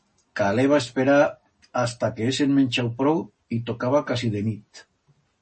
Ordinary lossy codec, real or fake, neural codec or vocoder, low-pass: MP3, 32 kbps; real; none; 10.8 kHz